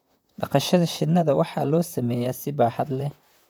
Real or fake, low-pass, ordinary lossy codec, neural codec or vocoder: fake; none; none; vocoder, 44.1 kHz, 128 mel bands, Pupu-Vocoder